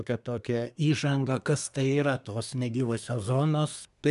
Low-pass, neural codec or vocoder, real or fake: 10.8 kHz; codec, 24 kHz, 1 kbps, SNAC; fake